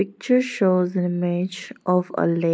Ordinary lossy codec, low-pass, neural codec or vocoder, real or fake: none; none; none; real